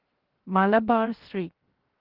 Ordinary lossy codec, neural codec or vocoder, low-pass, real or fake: Opus, 16 kbps; codec, 16 kHz, 0.7 kbps, FocalCodec; 5.4 kHz; fake